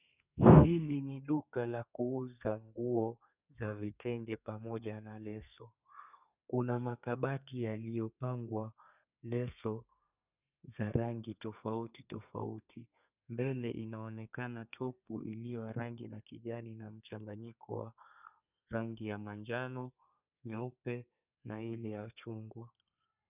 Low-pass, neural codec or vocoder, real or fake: 3.6 kHz; codec, 32 kHz, 1.9 kbps, SNAC; fake